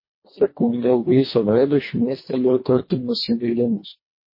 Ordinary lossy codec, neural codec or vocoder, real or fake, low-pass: MP3, 24 kbps; codec, 24 kHz, 1.5 kbps, HILCodec; fake; 5.4 kHz